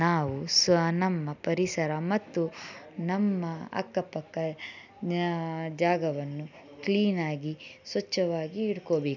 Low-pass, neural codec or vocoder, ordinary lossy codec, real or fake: 7.2 kHz; none; none; real